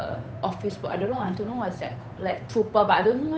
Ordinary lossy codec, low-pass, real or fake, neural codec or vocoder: none; none; fake; codec, 16 kHz, 8 kbps, FunCodec, trained on Chinese and English, 25 frames a second